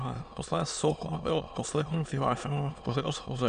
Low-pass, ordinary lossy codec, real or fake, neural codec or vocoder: 9.9 kHz; AAC, 96 kbps; fake; autoencoder, 22.05 kHz, a latent of 192 numbers a frame, VITS, trained on many speakers